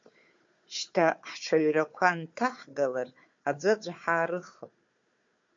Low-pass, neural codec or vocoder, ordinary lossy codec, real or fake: 7.2 kHz; codec, 16 kHz, 4 kbps, FunCodec, trained on Chinese and English, 50 frames a second; MP3, 48 kbps; fake